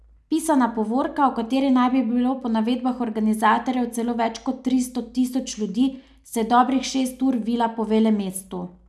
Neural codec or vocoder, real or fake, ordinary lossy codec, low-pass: none; real; none; none